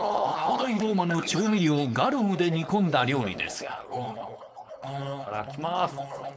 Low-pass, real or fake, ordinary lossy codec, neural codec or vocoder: none; fake; none; codec, 16 kHz, 4.8 kbps, FACodec